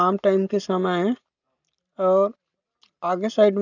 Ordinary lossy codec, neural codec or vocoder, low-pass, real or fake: none; none; 7.2 kHz; real